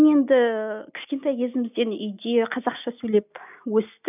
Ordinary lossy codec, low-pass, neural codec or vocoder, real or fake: none; 3.6 kHz; none; real